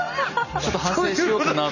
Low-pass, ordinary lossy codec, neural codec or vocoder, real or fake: 7.2 kHz; none; none; real